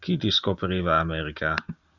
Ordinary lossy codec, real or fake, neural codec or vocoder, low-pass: Opus, 64 kbps; real; none; 7.2 kHz